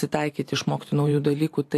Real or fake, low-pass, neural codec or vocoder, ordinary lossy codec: fake; 14.4 kHz; vocoder, 44.1 kHz, 128 mel bands every 512 samples, BigVGAN v2; AAC, 64 kbps